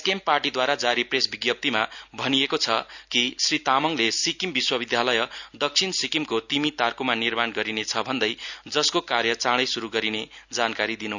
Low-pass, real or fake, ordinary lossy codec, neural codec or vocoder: 7.2 kHz; real; none; none